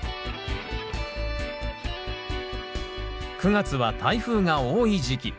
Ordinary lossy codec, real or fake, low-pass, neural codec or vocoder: none; real; none; none